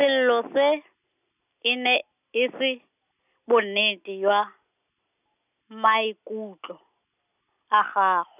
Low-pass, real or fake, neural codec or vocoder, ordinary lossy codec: 3.6 kHz; real; none; none